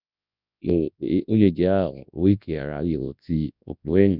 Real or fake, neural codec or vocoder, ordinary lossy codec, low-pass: fake; codec, 24 kHz, 0.9 kbps, WavTokenizer, large speech release; none; 5.4 kHz